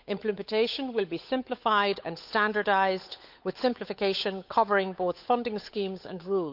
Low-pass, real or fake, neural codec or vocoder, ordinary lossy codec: 5.4 kHz; fake; codec, 16 kHz, 8 kbps, FunCodec, trained on Chinese and English, 25 frames a second; none